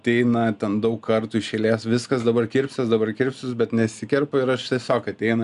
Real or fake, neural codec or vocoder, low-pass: real; none; 10.8 kHz